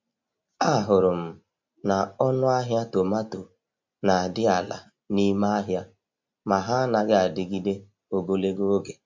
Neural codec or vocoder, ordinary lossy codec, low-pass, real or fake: none; MP3, 48 kbps; 7.2 kHz; real